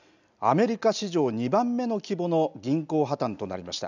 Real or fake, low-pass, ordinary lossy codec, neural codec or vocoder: real; 7.2 kHz; none; none